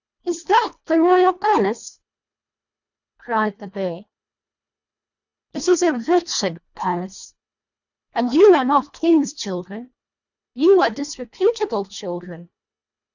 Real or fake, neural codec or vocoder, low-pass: fake; codec, 24 kHz, 1.5 kbps, HILCodec; 7.2 kHz